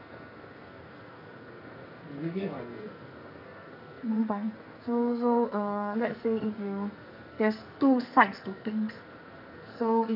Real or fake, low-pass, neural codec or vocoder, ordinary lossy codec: fake; 5.4 kHz; codec, 44.1 kHz, 2.6 kbps, SNAC; none